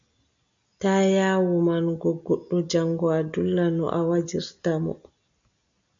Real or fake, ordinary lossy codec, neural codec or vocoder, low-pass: real; AAC, 64 kbps; none; 7.2 kHz